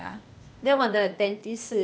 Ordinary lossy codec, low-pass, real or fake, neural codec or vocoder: none; none; fake; codec, 16 kHz, 0.8 kbps, ZipCodec